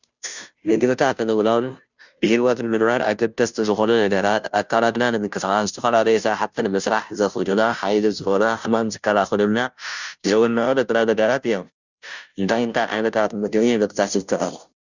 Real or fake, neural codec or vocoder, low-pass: fake; codec, 16 kHz, 0.5 kbps, FunCodec, trained on Chinese and English, 25 frames a second; 7.2 kHz